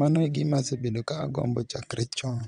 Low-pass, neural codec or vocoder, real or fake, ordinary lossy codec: 9.9 kHz; vocoder, 22.05 kHz, 80 mel bands, WaveNeXt; fake; none